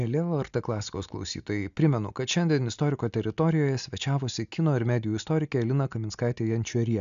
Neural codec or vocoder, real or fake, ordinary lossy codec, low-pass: none; real; MP3, 96 kbps; 7.2 kHz